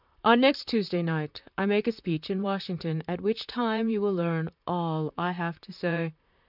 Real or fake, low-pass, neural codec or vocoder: fake; 5.4 kHz; vocoder, 44.1 kHz, 128 mel bands, Pupu-Vocoder